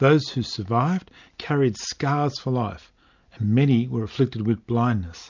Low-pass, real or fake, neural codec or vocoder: 7.2 kHz; real; none